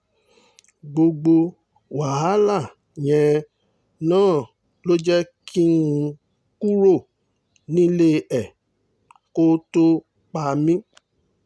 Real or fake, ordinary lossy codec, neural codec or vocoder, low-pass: real; none; none; none